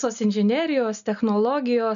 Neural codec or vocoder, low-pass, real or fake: none; 7.2 kHz; real